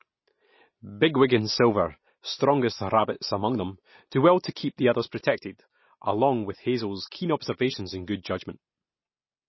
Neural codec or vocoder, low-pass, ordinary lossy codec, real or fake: none; 7.2 kHz; MP3, 24 kbps; real